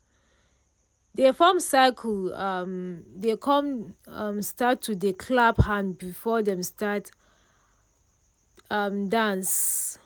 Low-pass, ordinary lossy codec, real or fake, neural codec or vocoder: none; none; real; none